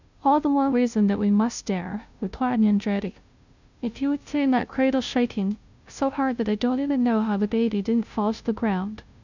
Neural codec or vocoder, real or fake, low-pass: codec, 16 kHz, 0.5 kbps, FunCodec, trained on Chinese and English, 25 frames a second; fake; 7.2 kHz